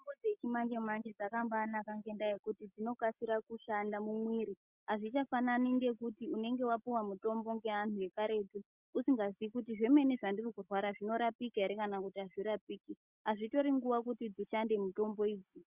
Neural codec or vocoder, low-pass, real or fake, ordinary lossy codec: none; 3.6 kHz; real; Opus, 64 kbps